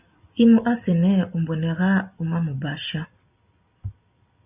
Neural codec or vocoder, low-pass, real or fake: none; 3.6 kHz; real